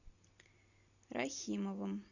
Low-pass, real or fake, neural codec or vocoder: 7.2 kHz; real; none